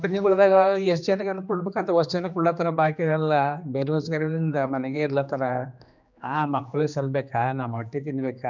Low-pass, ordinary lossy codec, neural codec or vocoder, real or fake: 7.2 kHz; none; codec, 16 kHz, 2 kbps, X-Codec, HuBERT features, trained on general audio; fake